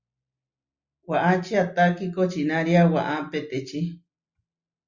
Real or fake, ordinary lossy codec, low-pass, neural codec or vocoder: real; Opus, 64 kbps; 7.2 kHz; none